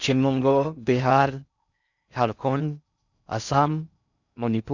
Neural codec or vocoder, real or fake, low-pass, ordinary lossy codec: codec, 16 kHz in and 24 kHz out, 0.6 kbps, FocalCodec, streaming, 4096 codes; fake; 7.2 kHz; none